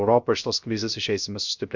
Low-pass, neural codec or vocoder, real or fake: 7.2 kHz; codec, 16 kHz, 0.3 kbps, FocalCodec; fake